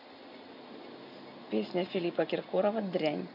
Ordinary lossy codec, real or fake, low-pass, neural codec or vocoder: none; real; 5.4 kHz; none